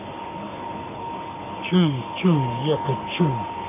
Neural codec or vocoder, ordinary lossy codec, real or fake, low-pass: codec, 44.1 kHz, 3.4 kbps, Pupu-Codec; none; fake; 3.6 kHz